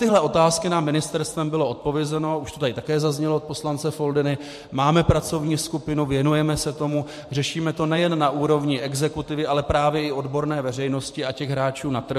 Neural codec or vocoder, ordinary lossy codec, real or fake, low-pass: vocoder, 44.1 kHz, 128 mel bands every 512 samples, BigVGAN v2; MP3, 64 kbps; fake; 14.4 kHz